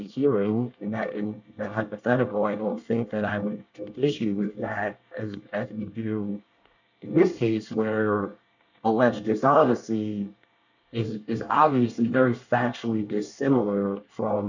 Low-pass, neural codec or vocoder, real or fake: 7.2 kHz; codec, 24 kHz, 1 kbps, SNAC; fake